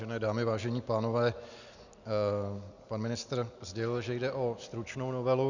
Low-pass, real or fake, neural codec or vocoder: 7.2 kHz; real; none